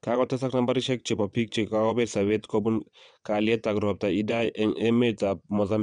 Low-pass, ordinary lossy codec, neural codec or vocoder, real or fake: 9.9 kHz; none; vocoder, 22.05 kHz, 80 mel bands, WaveNeXt; fake